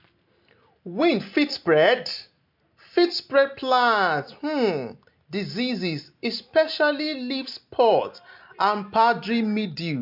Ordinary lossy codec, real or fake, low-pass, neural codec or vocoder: MP3, 48 kbps; real; 5.4 kHz; none